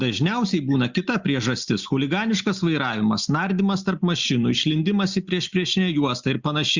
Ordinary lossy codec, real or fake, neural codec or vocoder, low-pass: Opus, 64 kbps; real; none; 7.2 kHz